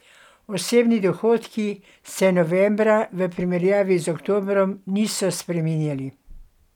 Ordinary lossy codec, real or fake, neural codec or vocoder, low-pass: none; real; none; 19.8 kHz